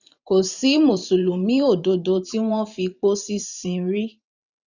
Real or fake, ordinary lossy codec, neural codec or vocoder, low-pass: fake; none; vocoder, 44.1 kHz, 128 mel bands every 512 samples, BigVGAN v2; 7.2 kHz